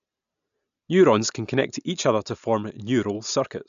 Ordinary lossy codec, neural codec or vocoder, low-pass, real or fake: AAC, 96 kbps; none; 7.2 kHz; real